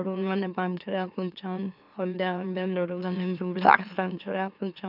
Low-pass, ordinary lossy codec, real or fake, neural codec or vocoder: 5.4 kHz; none; fake; autoencoder, 44.1 kHz, a latent of 192 numbers a frame, MeloTTS